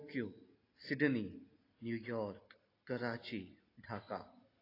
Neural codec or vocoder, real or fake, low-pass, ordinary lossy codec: none; real; 5.4 kHz; AAC, 24 kbps